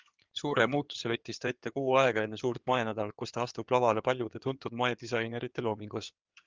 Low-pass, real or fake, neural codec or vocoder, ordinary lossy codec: 7.2 kHz; fake; codec, 16 kHz in and 24 kHz out, 2.2 kbps, FireRedTTS-2 codec; Opus, 32 kbps